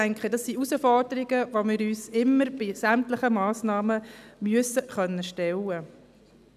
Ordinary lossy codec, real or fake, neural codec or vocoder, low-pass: none; real; none; 14.4 kHz